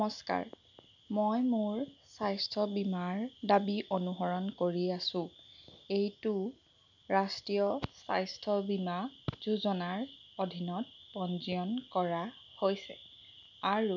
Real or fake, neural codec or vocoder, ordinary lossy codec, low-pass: real; none; none; 7.2 kHz